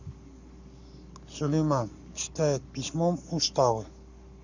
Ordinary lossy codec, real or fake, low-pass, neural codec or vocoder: none; fake; 7.2 kHz; codec, 44.1 kHz, 7.8 kbps, Pupu-Codec